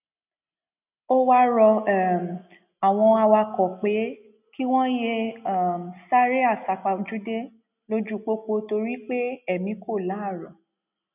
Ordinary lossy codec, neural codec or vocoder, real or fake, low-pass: none; none; real; 3.6 kHz